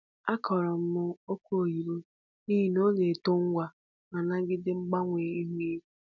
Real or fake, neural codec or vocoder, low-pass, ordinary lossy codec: real; none; 7.2 kHz; none